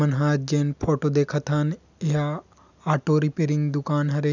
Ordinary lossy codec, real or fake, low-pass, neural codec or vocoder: none; real; 7.2 kHz; none